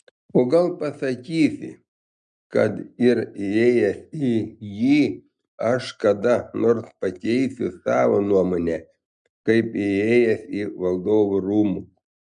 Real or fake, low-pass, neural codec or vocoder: real; 9.9 kHz; none